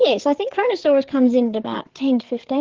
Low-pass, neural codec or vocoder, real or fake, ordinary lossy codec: 7.2 kHz; codec, 16 kHz, 4 kbps, FreqCodec, larger model; fake; Opus, 16 kbps